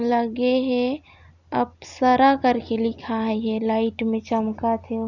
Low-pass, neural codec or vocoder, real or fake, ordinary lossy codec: 7.2 kHz; none; real; none